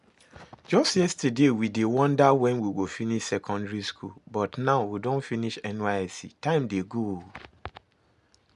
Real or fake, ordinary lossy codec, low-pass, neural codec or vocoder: real; none; 10.8 kHz; none